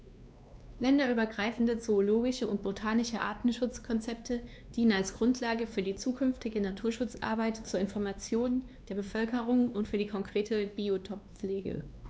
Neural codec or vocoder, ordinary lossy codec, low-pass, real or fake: codec, 16 kHz, 2 kbps, X-Codec, WavLM features, trained on Multilingual LibriSpeech; none; none; fake